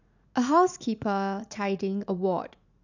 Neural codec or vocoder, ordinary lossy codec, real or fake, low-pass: vocoder, 44.1 kHz, 80 mel bands, Vocos; none; fake; 7.2 kHz